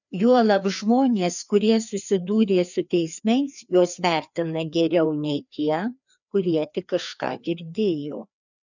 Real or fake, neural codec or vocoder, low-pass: fake; codec, 16 kHz, 2 kbps, FreqCodec, larger model; 7.2 kHz